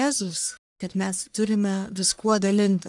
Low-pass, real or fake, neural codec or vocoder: 10.8 kHz; fake; codec, 44.1 kHz, 1.7 kbps, Pupu-Codec